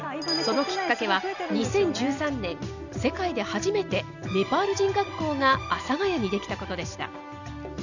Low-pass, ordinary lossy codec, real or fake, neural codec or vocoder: 7.2 kHz; none; real; none